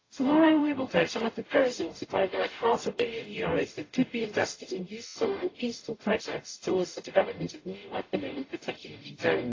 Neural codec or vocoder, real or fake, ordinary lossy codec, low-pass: codec, 44.1 kHz, 0.9 kbps, DAC; fake; AAC, 32 kbps; 7.2 kHz